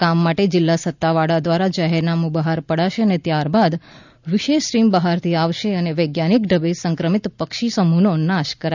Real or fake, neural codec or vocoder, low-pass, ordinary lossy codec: real; none; 7.2 kHz; none